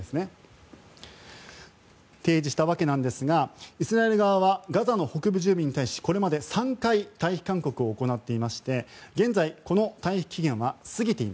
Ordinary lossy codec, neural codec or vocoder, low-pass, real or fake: none; none; none; real